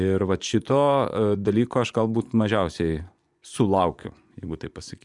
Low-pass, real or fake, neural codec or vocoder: 10.8 kHz; fake; vocoder, 24 kHz, 100 mel bands, Vocos